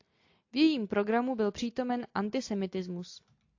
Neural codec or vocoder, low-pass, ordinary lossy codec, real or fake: none; 7.2 kHz; AAC, 48 kbps; real